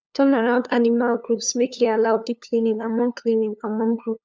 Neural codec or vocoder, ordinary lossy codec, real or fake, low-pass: codec, 16 kHz, 2 kbps, FunCodec, trained on LibriTTS, 25 frames a second; none; fake; none